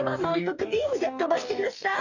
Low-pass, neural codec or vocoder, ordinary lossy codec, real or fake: 7.2 kHz; codec, 44.1 kHz, 2.6 kbps, DAC; none; fake